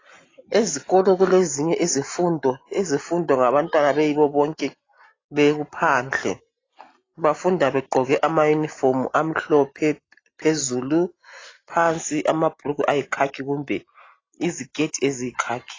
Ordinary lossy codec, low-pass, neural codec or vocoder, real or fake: AAC, 32 kbps; 7.2 kHz; none; real